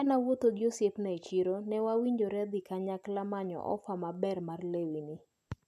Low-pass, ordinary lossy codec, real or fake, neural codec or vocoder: 14.4 kHz; none; real; none